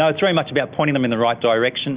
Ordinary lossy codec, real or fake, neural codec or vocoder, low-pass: Opus, 64 kbps; real; none; 3.6 kHz